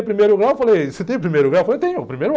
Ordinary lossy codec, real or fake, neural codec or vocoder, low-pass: none; real; none; none